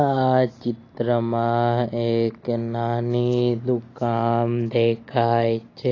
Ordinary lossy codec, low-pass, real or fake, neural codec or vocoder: AAC, 32 kbps; 7.2 kHz; real; none